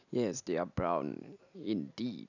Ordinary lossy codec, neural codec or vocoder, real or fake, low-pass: none; none; real; 7.2 kHz